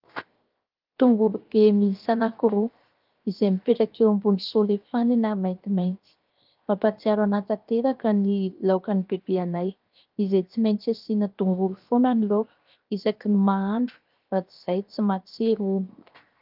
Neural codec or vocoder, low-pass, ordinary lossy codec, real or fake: codec, 16 kHz, 0.7 kbps, FocalCodec; 5.4 kHz; Opus, 32 kbps; fake